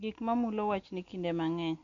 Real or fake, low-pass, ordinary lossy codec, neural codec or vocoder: real; 7.2 kHz; none; none